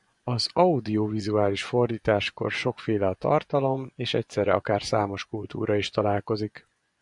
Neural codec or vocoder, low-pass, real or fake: vocoder, 24 kHz, 100 mel bands, Vocos; 10.8 kHz; fake